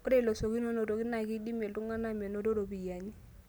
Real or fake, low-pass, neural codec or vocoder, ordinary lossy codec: real; none; none; none